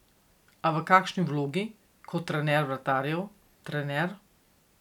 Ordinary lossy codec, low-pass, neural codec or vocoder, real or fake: none; 19.8 kHz; none; real